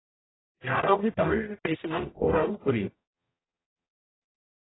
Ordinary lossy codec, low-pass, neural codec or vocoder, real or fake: AAC, 16 kbps; 7.2 kHz; codec, 44.1 kHz, 0.9 kbps, DAC; fake